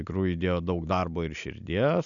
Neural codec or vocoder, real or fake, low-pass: none; real; 7.2 kHz